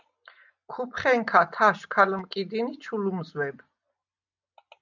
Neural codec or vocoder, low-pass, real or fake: none; 7.2 kHz; real